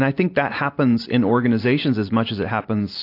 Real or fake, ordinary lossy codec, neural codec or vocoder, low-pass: real; AAC, 32 kbps; none; 5.4 kHz